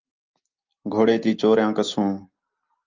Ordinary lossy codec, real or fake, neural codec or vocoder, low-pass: Opus, 24 kbps; real; none; 7.2 kHz